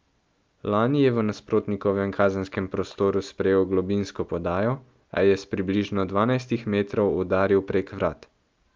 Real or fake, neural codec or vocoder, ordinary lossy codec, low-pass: real; none; Opus, 24 kbps; 7.2 kHz